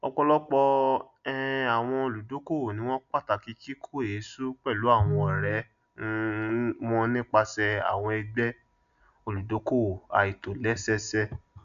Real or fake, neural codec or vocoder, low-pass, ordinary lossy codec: real; none; 7.2 kHz; MP3, 96 kbps